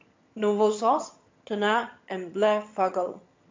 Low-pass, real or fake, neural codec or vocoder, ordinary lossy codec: 7.2 kHz; fake; vocoder, 22.05 kHz, 80 mel bands, HiFi-GAN; MP3, 48 kbps